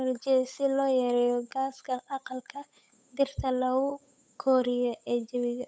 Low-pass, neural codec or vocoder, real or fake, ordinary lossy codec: none; codec, 16 kHz, 8 kbps, FunCodec, trained on Chinese and English, 25 frames a second; fake; none